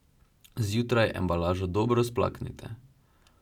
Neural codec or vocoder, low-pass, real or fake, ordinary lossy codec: none; 19.8 kHz; real; none